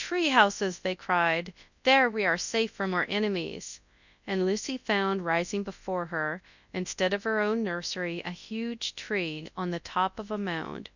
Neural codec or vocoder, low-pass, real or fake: codec, 24 kHz, 0.9 kbps, WavTokenizer, large speech release; 7.2 kHz; fake